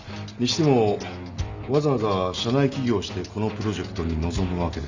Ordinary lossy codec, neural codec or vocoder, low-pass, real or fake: Opus, 64 kbps; none; 7.2 kHz; real